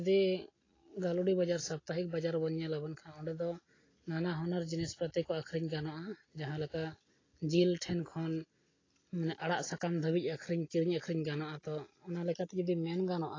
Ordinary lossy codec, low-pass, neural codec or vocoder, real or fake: AAC, 32 kbps; 7.2 kHz; none; real